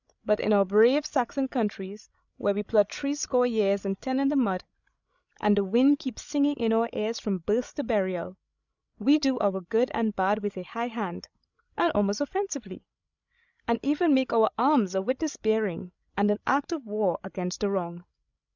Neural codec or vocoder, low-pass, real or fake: codec, 16 kHz, 16 kbps, FreqCodec, larger model; 7.2 kHz; fake